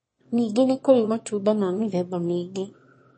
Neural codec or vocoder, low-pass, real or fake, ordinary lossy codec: autoencoder, 22.05 kHz, a latent of 192 numbers a frame, VITS, trained on one speaker; 9.9 kHz; fake; MP3, 32 kbps